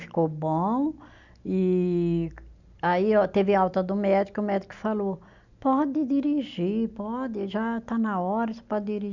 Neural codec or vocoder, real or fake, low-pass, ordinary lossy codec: none; real; 7.2 kHz; none